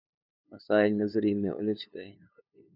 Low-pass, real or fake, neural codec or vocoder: 5.4 kHz; fake; codec, 16 kHz, 2 kbps, FunCodec, trained on LibriTTS, 25 frames a second